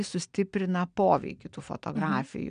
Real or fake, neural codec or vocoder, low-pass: real; none; 9.9 kHz